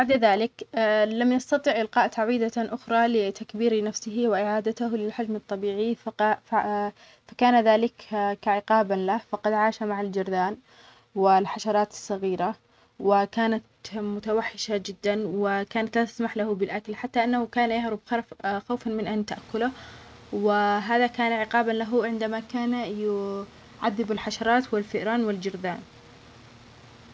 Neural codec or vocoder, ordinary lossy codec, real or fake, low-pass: none; none; real; none